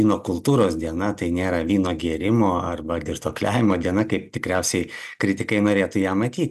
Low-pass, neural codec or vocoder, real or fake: 14.4 kHz; none; real